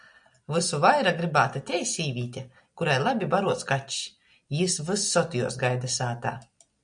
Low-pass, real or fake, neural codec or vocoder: 9.9 kHz; real; none